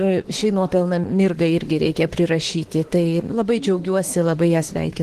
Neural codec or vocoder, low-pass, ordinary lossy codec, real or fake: autoencoder, 48 kHz, 32 numbers a frame, DAC-VAE, trained on Japanese speech; 14.4 kHz; Opus, 16 kbps; fake